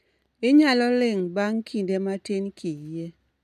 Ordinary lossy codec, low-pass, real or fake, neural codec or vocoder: none; 14.4 kHz; real; none